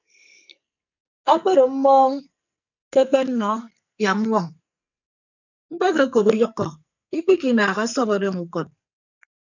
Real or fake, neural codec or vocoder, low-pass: fake; codec, 44.1 kHz, 2.6 kbps, SNAC; 7.2 kHz